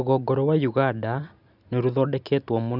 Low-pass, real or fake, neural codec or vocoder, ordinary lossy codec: 5.4 kHz; real; none; none